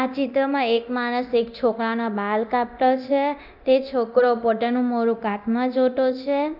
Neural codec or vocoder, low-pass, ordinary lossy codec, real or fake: codec, 24 kHz, 0.9 kbps, DualCodec; 5.4 kHz; none; fake